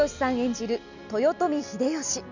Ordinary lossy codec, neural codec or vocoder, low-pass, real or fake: none; none; 7.2 kHz; real